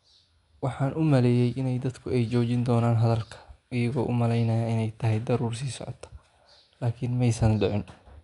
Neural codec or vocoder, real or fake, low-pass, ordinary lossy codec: none; real; 10.8 kHz; none